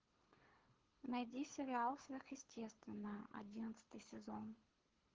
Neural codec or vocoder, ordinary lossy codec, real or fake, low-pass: codec, 24 kHz, 6 kbps, HILCodec; Opus, 16 kbps; fake; 7.2 kHz